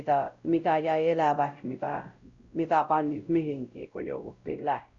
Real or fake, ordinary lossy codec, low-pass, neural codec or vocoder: fake; Opus, 64 kbps; 7.2 kHz; codec, 16 kHz, 0.5 kbps, X-Codec, WavLM features, trained on Multilingual LibriSpeech